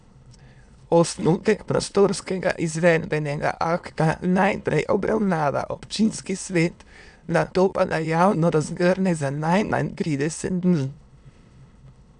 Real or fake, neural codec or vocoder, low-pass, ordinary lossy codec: fake; autoencoder, 22.05 kHz, a latent of 192 numbers a frame, VITS, trained on many speakers; 9.9 kHz; none